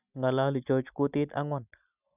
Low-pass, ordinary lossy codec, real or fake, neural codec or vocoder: 3.6 kHz; none; real; none